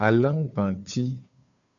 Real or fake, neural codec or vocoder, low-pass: fake; codec, 16 kHz, 2 kbps, FunCodec, trained on Chinese and English, 25 frames a second; 7.2 kHz